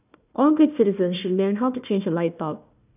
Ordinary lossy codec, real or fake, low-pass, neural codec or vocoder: none; fake; 3.6 kHz; codec, 16 kHz, 1 kbps, FunCodec, trained on Chinese and English, 50 frames a second